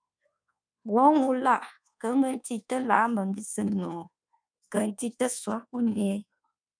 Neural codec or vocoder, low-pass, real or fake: codec, 24 kHz, 1.2 kbps, DualCodec; 9.9 kHz; fake